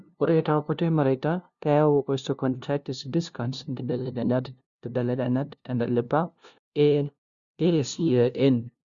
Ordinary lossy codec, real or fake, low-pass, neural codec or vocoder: Opus, 64 kbps; fake; 7.2 kHz; codec, 16 kHz, 0.5 kbps, FunCodec, trained on LibriTTS, 25 frames a second